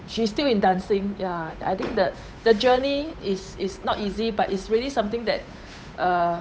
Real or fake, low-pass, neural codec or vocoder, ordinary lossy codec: fake; none; codec, 16 kHz, 8 kbps, FunCodec, trained on Chinese and English, 25 frames a second; none